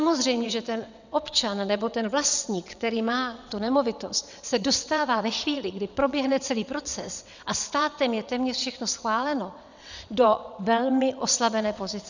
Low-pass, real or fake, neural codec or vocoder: 7.2 kHz; fake; vocoder, 22.05 kHz, 80 mel bands, WaveNeXt